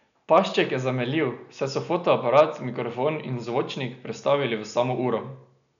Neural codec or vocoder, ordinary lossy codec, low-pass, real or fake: none; none; 7.2 kHz; real